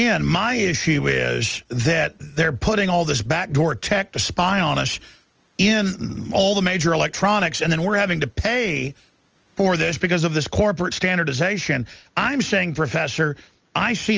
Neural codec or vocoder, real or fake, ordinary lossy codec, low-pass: none; real; Opus, 24 kbps; 7.2 kHz